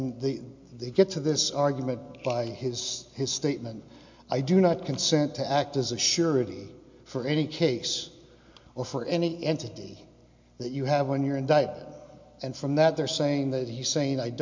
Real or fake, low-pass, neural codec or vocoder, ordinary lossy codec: real; 7.2 kHz; none; MP3, 48 kbps